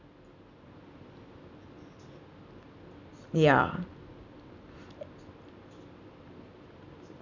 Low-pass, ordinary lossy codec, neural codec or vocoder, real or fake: 7.2 kHz; none; none; real